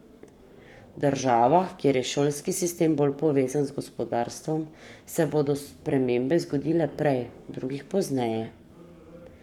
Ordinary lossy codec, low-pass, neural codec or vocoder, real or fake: none; 19.8 kHz; codec, 44.1 kHz, 7.8 kbps, Pupu-Codec; fake